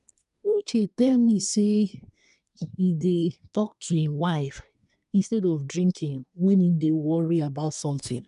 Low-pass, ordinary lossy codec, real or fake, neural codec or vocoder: 10.8 kHz; none; fake; codec, 24 kHz, 1 kbps, SNAC